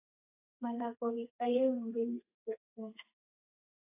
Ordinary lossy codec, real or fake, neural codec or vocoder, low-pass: AAC, 24 kbps; fake; codec, 32 kHz, 1.9 kbps, SNAC; 3.6 kHz